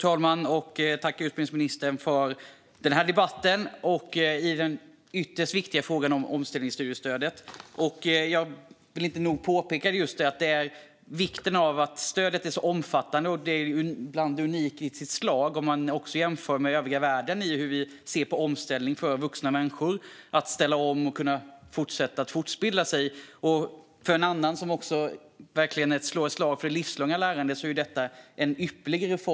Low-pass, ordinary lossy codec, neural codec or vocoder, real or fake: none; none; none; real